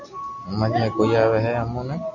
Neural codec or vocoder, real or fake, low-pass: none; real; 7.2 kHz